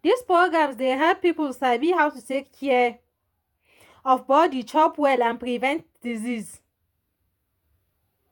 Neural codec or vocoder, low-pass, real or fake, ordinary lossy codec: vocoder, 48 kHz, 128 mel bands, Vocos; 19.8 kHz; fake; none